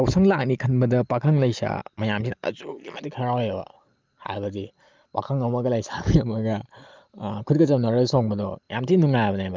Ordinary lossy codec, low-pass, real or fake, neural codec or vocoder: Opus, 32 kbps; 7.2 kHz; real; none